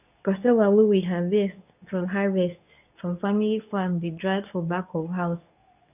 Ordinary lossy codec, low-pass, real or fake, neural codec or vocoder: none; 3.6 kHz; fake; codec, 24 kHz, 0.9 kbps, WavTokenizer, medium speech release version 2